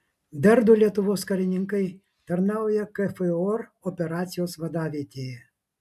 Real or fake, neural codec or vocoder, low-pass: real; none; 14.4 kHz